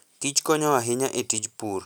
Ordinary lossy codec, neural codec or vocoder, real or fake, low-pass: none; none; real; none